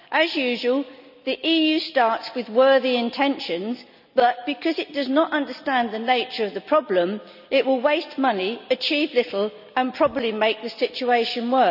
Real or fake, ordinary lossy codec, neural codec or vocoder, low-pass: real; none; none; 5.4 kHz